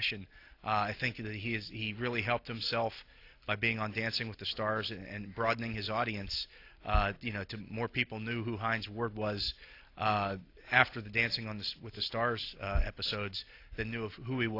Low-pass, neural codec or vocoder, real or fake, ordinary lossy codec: 5.4 kHz; none; real; AAC, 32 kbps